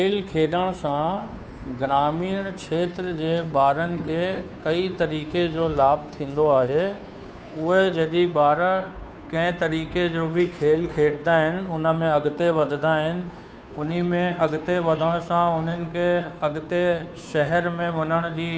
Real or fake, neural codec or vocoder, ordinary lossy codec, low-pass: fake; codec, 16 kHz, 2 kbps, FunCodec, trained on Chinese and English, 25 frames a second; none; none